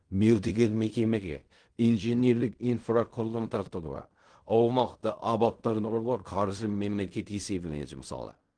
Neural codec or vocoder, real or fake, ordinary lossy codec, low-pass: codec, 16 kHz in and 24 kHz out, 0.4 kbps, LongCat-Audio-Codec, fine tuned four codebook decoder; fake; Opus, 24 kbps; 9.9 kHz